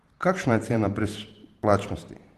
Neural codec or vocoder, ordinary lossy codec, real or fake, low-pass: vocoder, 44.1 kHz, 128 mel bands every 512 samples, BigVGAN v2; Opus, 16 kbps; fake; 14.4 kHz